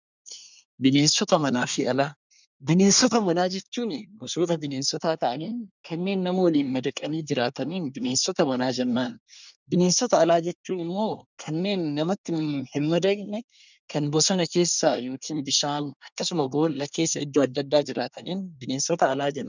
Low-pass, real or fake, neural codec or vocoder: 7.2 kHz; fake; codec, 24 kHz, 1 kbps, SNAC